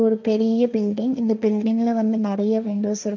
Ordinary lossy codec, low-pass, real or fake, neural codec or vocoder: none; 7.2 kHz; fake; codec, 16 kHz, 1.1 kbps, Voila-Tokenizer